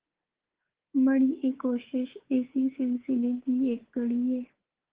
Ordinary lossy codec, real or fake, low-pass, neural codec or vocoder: Opus, 16 kbps; fake; 3.6 kHz; codec, 24 kHz, 3.1 kbps, DualCodec